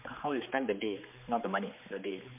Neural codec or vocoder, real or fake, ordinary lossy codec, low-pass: codec, 16 kHz, 4 kbps, X-Codec, HuBERT features, trained on general audio; fake; MP3, 32 kbps; 3.6 kHz